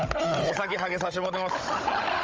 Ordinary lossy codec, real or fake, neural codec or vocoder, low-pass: Opus, 24 kbps; fake; codec, 16 kHz, 16 kbps, FunCodec, trained on Chinese and English, 50 frames a second; 7.2 kHz